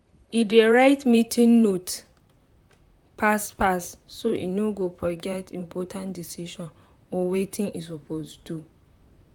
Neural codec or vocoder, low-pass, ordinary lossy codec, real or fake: vocoder, 44.1 kHz, 128 mel bands, Pupu-Vocoder; 19.8 kHz; none; fake